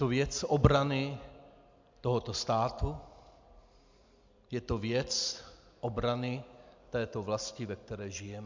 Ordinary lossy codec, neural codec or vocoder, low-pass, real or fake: MP3, 64 kbps; none; 7.2 kHz; real